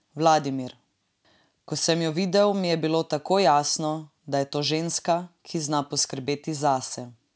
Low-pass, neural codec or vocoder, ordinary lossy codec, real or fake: none; none; none; real